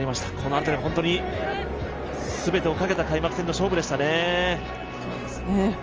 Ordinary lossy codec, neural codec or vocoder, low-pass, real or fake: Opus, 24 kbps; none; 7.2 kHz; real